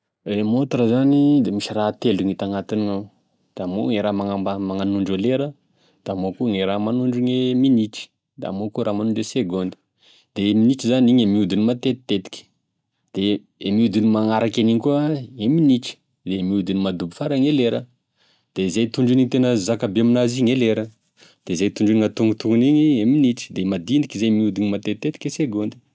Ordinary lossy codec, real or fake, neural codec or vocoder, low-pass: none; real; none; none